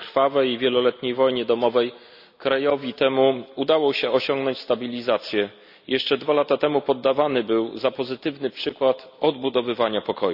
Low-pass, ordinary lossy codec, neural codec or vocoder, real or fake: 5.4 kHz; none; none; real